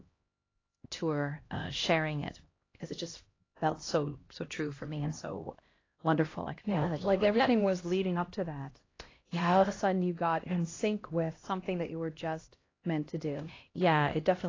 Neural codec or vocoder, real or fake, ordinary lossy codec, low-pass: codec, 16 kHz, 1 kbps, X-Codec, HuBERT features, trained on LibriSpeech; fake; AAC, 32 kbps; 7.2 kHz